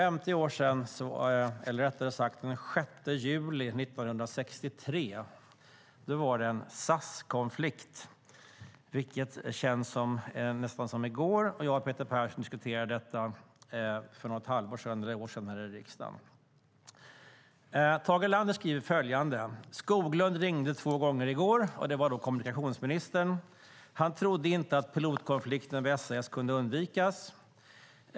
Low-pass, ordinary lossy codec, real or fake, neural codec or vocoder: none; none; real; none